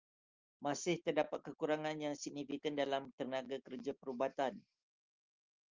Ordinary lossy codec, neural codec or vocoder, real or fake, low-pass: Opus, 32 kbps; none; real; 7.2 kHz